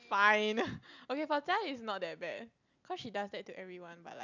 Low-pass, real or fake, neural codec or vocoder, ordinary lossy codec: 7.2 kHz; real; none; none